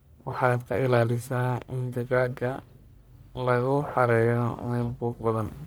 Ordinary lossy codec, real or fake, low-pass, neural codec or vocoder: none; fake; none; codec, 44.1 kHz, 1.7 kbps, Pupu-Codec